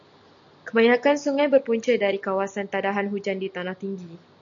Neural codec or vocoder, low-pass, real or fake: none; 7.2 kHz; real